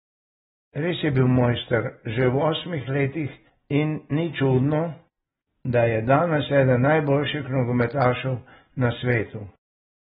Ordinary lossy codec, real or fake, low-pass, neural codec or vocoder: AAC, 16 kbps; real; 19.8 kHz; none